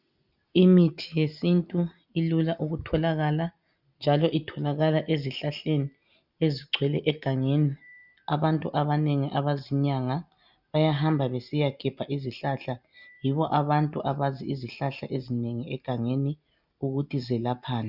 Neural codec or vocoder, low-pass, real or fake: none; 5.4 kHz; real